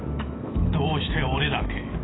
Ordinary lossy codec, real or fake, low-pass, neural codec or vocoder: AAC, 16 kbps; fake; 7.2 kHz; autoencoder, 48 kHz, 128 numbers a frame, DAC-VAE, trained on Japanese speech